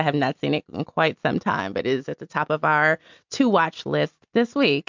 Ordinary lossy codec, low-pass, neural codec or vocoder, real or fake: MP3, 64 kbps; 7.2 kHz; none; real